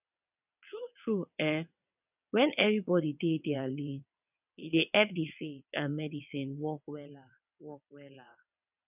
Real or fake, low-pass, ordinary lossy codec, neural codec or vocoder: fake; 3.6 kHz; none; vocoder, 22.05 kHz, 80 mel bands, WaveNeXt